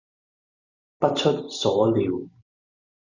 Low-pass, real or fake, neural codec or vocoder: 7.2 kHz; real; none